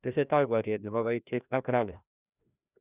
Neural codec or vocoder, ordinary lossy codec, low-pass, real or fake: codec, 16 kHz, 1 kbps, FreqCodec, larger model; none; 3.6 kHz; fake